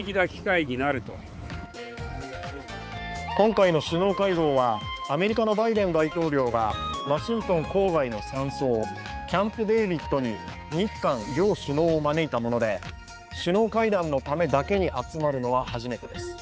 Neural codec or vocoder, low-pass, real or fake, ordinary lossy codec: codec, 16 kHz, 4 kbps, X-Codec, HuBERT features, trained on balanced general audio; none; fake; none